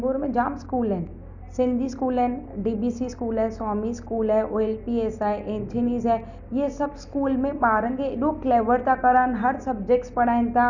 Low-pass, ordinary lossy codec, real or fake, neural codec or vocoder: 7.2 kHz; none; real; none